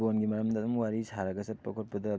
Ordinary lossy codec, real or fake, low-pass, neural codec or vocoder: none; real; none; none